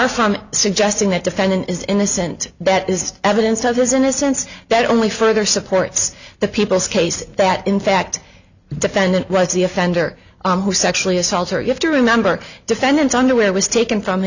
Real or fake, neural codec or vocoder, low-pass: real; none; 7.2 kHz